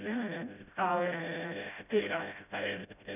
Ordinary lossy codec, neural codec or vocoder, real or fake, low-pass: none; codec, 16 kHz, 0.5 kbps, FreqCodec, smaller model; fake; 3.6 kHz